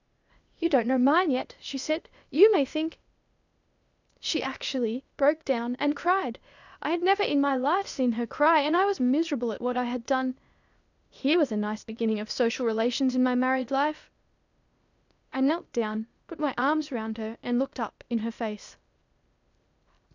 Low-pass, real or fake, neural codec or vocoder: 7.2 kHz; fake; codec, 16 kHz, 0.8 kbps, ZipCodec